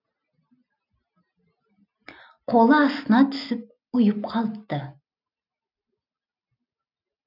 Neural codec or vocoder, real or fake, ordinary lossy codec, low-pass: none; real; none; 5.4 kHz